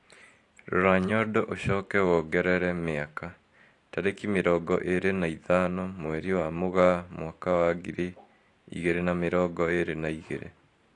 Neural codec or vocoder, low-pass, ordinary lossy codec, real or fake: vocoder, 44.1 kHz, 128 mel bands every 512 samples, BigVGAN v2; 10.8 kHz; AAC, 48 kbps; fake